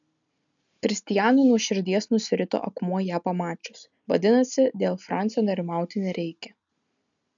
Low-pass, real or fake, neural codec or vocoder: 7.2 kHz; real; none